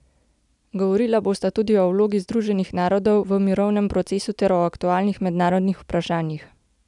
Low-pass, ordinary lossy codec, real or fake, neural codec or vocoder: 10.8 kHz; none; real; none